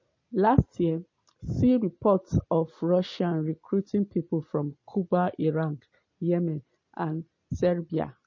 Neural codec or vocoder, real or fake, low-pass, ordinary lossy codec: none; real; 7.2 kHz; MP3, 32 kbps